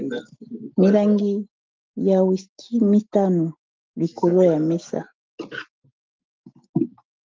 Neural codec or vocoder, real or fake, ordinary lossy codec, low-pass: none; real; Opus, 32 kbps; 7.2 kHz